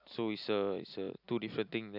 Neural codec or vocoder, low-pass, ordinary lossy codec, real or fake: none; 5.4 kHz; none; real